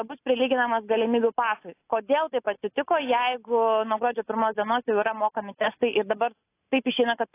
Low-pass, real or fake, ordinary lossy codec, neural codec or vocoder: 3.6 kHz; real; AAC, 24 kbps; none